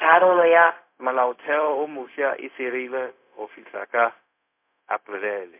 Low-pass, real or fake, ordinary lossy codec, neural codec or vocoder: 3.6 kHz; fake; MP3, 32 kbps; codec, 16 kHz, 0.4 kbps, LongCat-Audio-Codec